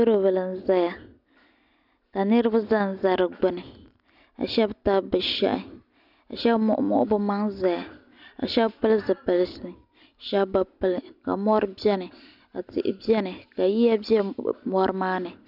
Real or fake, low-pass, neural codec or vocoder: real; 5.4 kHz; none